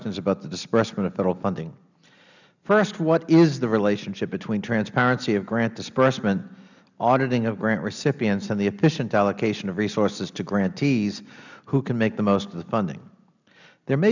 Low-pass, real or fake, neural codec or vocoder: 7.2 kHz; real; none